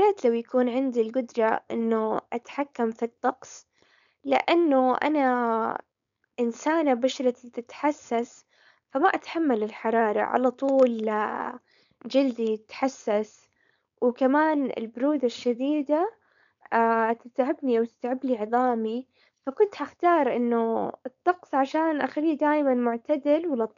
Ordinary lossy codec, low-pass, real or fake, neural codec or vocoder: none; 7.2 kHz; fake; codec, 16 kHz, 4.8 kbps, FACodec